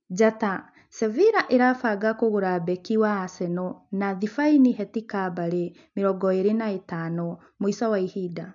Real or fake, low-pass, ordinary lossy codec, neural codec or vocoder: real; 7.2 kHz; MP3, 64 kbps; none